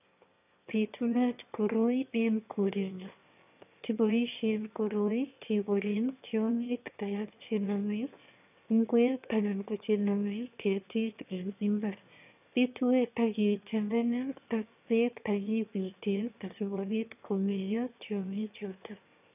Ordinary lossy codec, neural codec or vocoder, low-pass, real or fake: none; autoencoder, 22.05 kHz, a latent of 192 numbers a frame, VITS, trained on one speaker; 3.6 kHz; fake